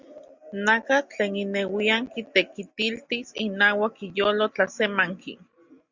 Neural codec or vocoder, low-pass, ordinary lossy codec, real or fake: none; 7.2 kHz; Opus, 64 kbps; real